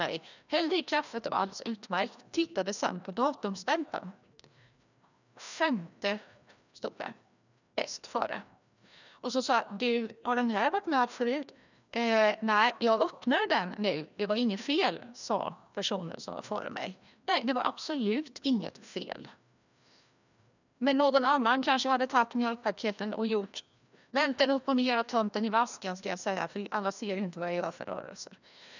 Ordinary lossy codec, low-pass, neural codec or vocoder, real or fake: none; 7.2 kHz; codec, 16 kHz, 1 kbps, FreqCodec, larger model; fake